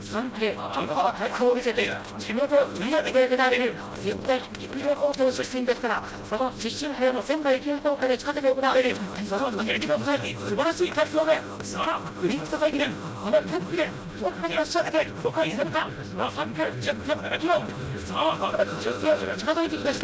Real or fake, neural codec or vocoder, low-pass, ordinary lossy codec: fake; codec, 16 kHz, 0.5 kbps, FreqCodec, smaller model; none; none